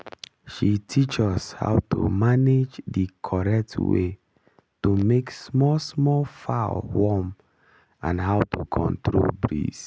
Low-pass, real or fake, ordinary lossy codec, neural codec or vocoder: none; real; none; none